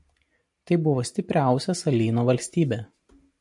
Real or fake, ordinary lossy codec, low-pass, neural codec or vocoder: real; MP3, 48 kbps; 10.8 kHz; none